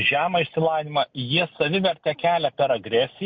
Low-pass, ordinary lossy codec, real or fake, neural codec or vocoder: 7.2 kHz; MP3, 48 kbps; real; none